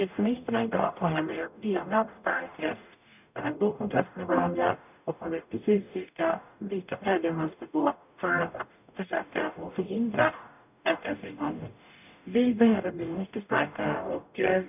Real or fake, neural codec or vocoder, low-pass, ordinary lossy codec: fake; codec, 44.1 kHz, 0.9 kbps, DAC; 3.6 kHz; none